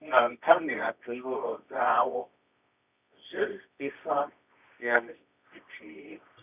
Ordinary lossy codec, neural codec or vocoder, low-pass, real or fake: none; codec, 24 kHz, 0.9 kbps, WavTokenizer, medium music audio release; 3.6 kHz; fake